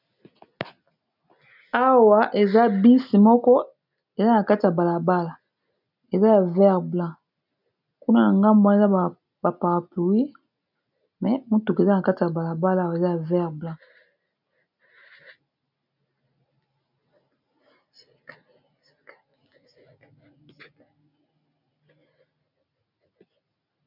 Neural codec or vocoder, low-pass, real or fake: none; 5.4 kHz; real